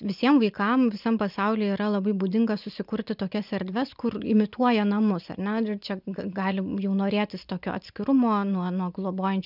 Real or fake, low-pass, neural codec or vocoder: real; 5.4 kHz; none